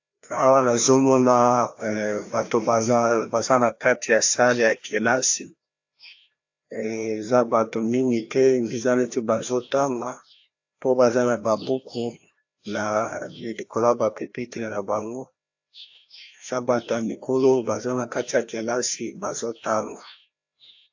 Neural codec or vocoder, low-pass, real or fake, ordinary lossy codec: codec, 16 kHz, 1 kbps, FreqCodec, larger model; 7.2 kHz; fake; AAC, 48 kbps